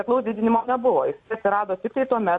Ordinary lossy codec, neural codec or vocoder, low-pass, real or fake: MP3, 48 kbps; none; 10.8 kHz; real